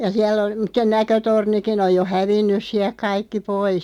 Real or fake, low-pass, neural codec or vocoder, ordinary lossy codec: real; 19.8 kHz; none; none